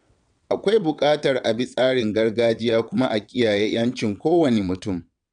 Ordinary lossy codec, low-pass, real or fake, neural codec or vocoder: none; 9.9 kHz; fake; vocoder, 22.05 kHz, 80 mel bands, WaveNeXt